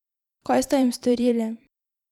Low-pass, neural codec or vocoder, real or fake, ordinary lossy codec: 19.8 kHz; vocoder, 44.1 kHz, 128 mel bands every 512 samples, BigVGAN v2; fake; none